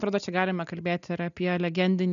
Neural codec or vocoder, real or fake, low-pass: none; real; 7.2 kHz